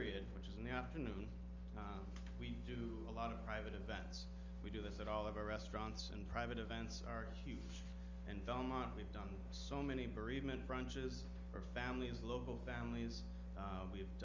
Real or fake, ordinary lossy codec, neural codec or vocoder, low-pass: real; Opus, 32 kbps; none; 7.2 kHz